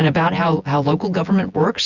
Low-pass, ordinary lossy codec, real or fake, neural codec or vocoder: 7.2 kHz; Opus, 64 kbps; fake; vocoder, 24 kHz, 100 mel bands, Vocos